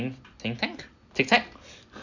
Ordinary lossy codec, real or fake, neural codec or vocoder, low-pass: none; real; none; 7.2 kHz